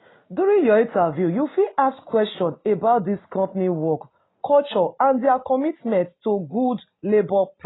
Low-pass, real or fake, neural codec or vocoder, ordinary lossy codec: 7.2 kHz; real; none; AAC, 16 kbps